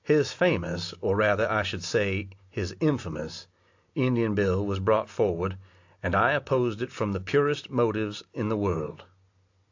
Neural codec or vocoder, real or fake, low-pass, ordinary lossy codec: none; real; 7.2 kHz; AAC, 48 kbps